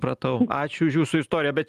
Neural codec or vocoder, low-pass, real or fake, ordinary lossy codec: none; 14.4 kHz; real; Opus, 32 kbps